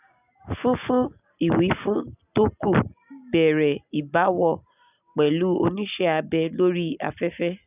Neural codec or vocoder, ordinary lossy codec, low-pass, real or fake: none; none; 3.6 kHz; real